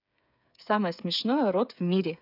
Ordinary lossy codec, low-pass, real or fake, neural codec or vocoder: none; 5.4 kHz; fake; codec, 16 kHz, 8 kbps, FreqCodec, smaller model